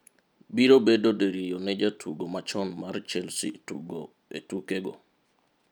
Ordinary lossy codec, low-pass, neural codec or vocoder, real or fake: none; none; none; real